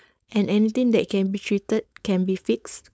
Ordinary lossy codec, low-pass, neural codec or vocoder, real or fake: none; none; codec, 16 kHz, 4.8 kbps, FACodec; fake